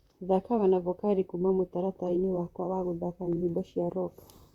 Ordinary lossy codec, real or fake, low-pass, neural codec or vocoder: Opus, 64 kbps; fake; 19.8 kHz; vocoder, 44.1 kHz, 128 mel bands, Pupu-Vocoder